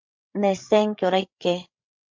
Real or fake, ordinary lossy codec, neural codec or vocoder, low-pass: fake; MP3, 64 kbps; codec, 16 kHz, 8 kbps, FreqCodec, larger model; 7.2 kHz